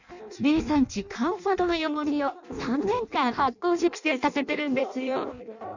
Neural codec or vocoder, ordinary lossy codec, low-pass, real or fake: codec, 16 kHz in and 24 kHz out, 0.6 kbps, FireRedTTS-2 codec; none; 7.2 kHz; fake